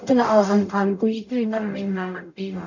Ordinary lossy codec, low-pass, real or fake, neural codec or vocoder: none; 7.2 kHz; fake; codec, 44.1 kHz, 0.9 kbps, DAC